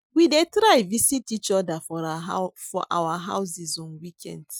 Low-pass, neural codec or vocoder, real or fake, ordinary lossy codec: none; none; real; none